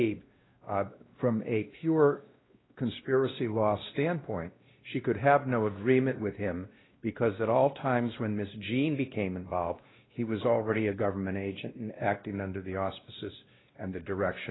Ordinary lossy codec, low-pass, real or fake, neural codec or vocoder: AAC, 16 kbps; 7.2 kHz; fake; codec, 16 kHz, 1 kbps, X-Codec, WavLM features, trained on Multilingual LibriSpeech